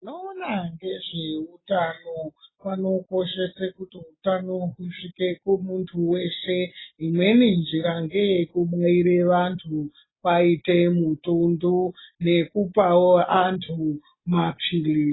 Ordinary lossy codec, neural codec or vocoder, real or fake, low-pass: AAC, 16 kbps; none; real; 7.2 kHz